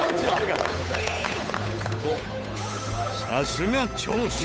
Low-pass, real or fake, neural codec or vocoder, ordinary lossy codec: none; fake; codec, 16 kHz, 8 kbps, FunCodec, trained on Chinese and English, 25 frames a second; none